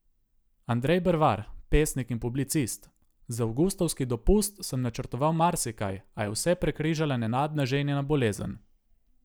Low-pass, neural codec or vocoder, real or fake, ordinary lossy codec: none; none; real; none